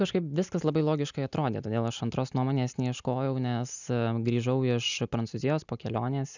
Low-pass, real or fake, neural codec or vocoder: 7.2 kHz; real; none